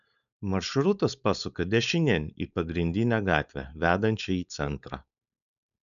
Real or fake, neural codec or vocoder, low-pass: fake; codec, 16 kHz, 4.8 kbps, FACodec; 7.2 kHz